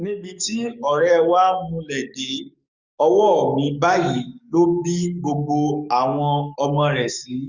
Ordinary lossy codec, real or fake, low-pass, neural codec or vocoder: Opus, 64 kbps; fake; 7.2 kHz; codec, 44.1 kHz, 7.8 kbps, DAC